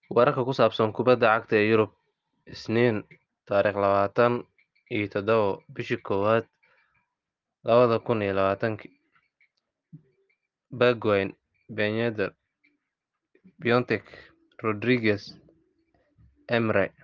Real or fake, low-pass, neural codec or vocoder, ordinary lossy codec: real; 7.2 kHz; none; Opus, 32 kbps